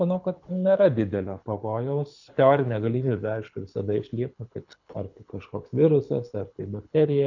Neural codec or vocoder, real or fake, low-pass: codec, 24 kHz, 6 kbps, HILCodec; fake; 7.2 kHz